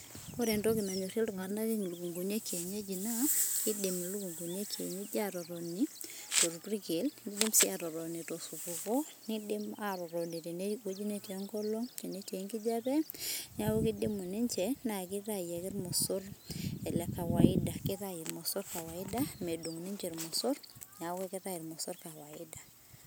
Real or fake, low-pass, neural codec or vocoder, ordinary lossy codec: real; none; none; none